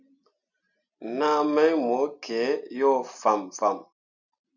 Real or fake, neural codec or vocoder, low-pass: real; none; 7.2 kHz